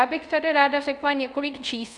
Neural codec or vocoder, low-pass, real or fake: codec, 24 kHz, 0.5 kbps, DualCodec; 10.8 kHz; fake